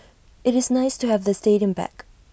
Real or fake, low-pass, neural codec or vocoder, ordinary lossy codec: real; none; none; none